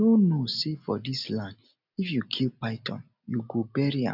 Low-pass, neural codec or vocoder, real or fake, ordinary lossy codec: 5.4 kHz; none; real; none